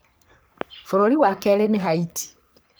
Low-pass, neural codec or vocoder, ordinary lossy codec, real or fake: none; codec, 44.1 kHz, 3.4 kbps, Pupu-Codec; none; fake